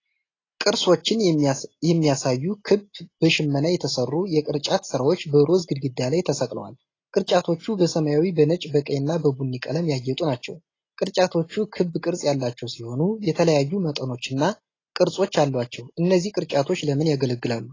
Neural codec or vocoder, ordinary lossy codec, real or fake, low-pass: none; AAC, 32 kbps; real; 7.2 kHz